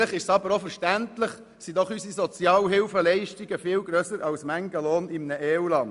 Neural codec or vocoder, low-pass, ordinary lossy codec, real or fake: none; 10.8 kHz; none; real